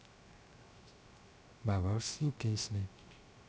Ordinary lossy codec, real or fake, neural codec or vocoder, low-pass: none; fake; codec, 16 kHz, 0.3 kbps, FocalCodec; none